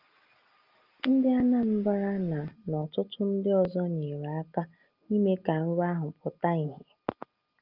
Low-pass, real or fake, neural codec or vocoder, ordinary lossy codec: 5.4 kHz; real; none; Opus, 24 kbps